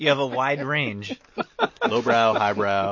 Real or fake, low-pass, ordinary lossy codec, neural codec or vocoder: real; 7.2 kHz; MP3, 32 kbps; none